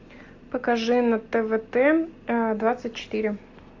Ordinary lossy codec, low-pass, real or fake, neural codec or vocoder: AAC, 48 kbps; 7.2 kHz; real; none